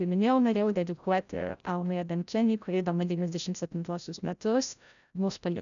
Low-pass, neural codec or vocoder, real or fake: 7.2 kHz; codec, 16 kHz, 0.5 kbps, FreqCodec, larger model; fake